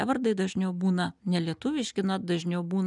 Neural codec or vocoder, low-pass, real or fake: none; 10.8 kHz; real